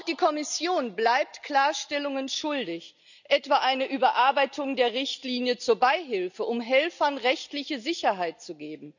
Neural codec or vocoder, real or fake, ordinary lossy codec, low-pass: none; real; none; 7.2 kHz